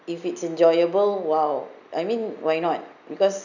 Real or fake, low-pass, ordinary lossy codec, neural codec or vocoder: real; 7.2 kHz; none; none